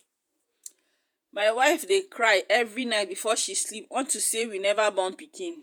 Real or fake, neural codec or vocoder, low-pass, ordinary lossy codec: fake; vocoder, 48 kHz, 128 mel bands, Vocos; none; none